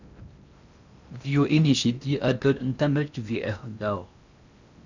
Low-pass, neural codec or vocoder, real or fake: 7.2 kHz; codec, 16 kHz in and 24 kHz out, 0.6 kbps, FocalCodec, streaming, 2048 codes; fake